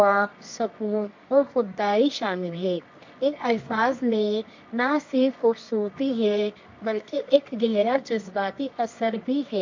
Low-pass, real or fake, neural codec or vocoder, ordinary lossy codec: 7.2 kHz; fake; codec, 24 kHz, 0.9 kbps, WavTokenizer, medium music audio release; MP3, 48 kbps